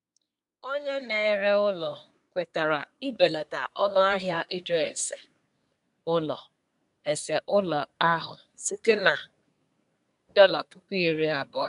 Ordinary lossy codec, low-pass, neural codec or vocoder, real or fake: none; 10.8 kHz; codec, 24 kHz, 1 kbps, SNAC; fake